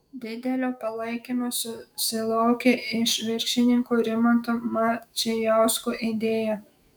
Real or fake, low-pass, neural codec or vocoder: fake; 19.8 kHz; autoencoder, 48 kHz, 128 numbers a frame, DAC-VAE, trained on Japanese speech